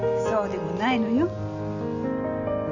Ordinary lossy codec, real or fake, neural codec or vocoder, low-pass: none; real; none; 7.2 kHz